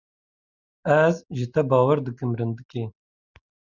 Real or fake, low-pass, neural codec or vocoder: real; 7.2 kHz; none